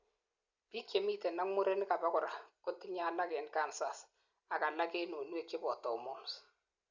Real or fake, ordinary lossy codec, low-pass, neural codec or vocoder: real; Opus, 64 kbps; 7.2 kHz; none